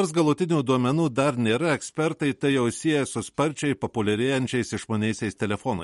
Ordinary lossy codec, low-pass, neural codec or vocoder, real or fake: MP3, 48 kbps; 19.8 kHz; none; real